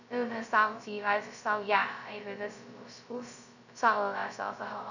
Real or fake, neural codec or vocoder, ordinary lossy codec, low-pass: fake; codec, 16 kHz, 0.2 kbps, FocalCodec; none; 7.2 kHz